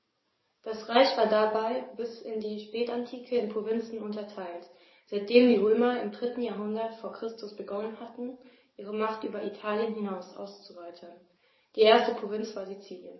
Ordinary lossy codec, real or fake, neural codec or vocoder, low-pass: MP3, 24 kbps; fake; codec, 44.1 kHz, 7.8 kbps, DAC; 7.2 kHz